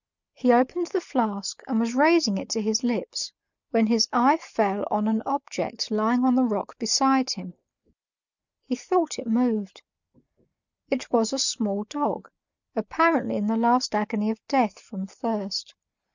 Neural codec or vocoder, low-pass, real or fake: none; 7.2 kHz; real